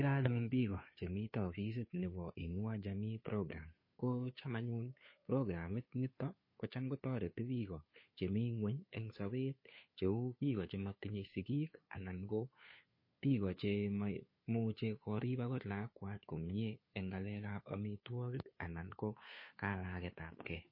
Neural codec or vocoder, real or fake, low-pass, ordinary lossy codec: codec, 16 kHz, 2 kbps, FunCodec, trained on Chinese and English, 25 frames a second; fake; 5.4 kHz; MP3, 24 kbps